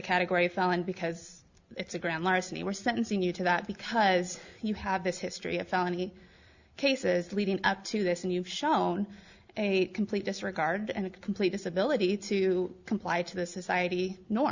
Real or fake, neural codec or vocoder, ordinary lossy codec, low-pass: real; none; Opus, 64 kbps; 7.2 kHz